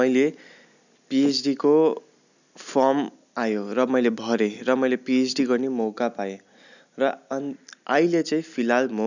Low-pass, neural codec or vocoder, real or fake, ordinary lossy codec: 7.2 kHz; none; real; none